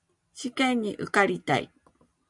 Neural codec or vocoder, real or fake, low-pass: none; real; 10.8 kHz